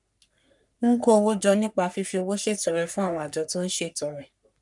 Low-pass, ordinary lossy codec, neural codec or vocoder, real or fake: 10.8 kHz; none; codec, 44.1 kHz, 3.4 kbps, Pupu-Codec; fake